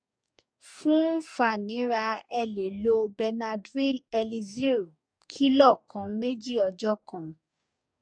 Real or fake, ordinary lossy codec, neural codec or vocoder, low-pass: fake; MP3, 96 kbps; codec, 44.1 kHz, 2.6 kbps, DAC; 9.9 kHz